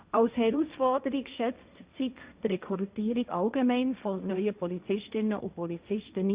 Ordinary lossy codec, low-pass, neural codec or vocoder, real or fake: Opus, 64 kbps; 3.6 kHz; codec, 16 kHz, 1.1 kbps, Voila-Tokenizer; fake